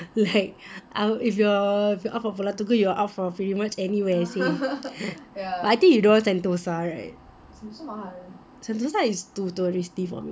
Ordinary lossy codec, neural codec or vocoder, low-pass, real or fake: none; none; none; real